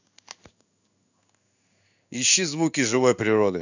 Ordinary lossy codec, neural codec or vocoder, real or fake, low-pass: none; codec, 16 kHz in and 24 kHz out, 1 kbps, XY-Tokenizer; fake; 7.2 kHz